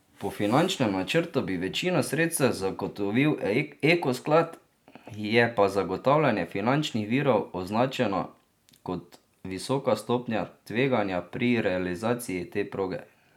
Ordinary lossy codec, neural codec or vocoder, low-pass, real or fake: none; none; 19.8 kHz; real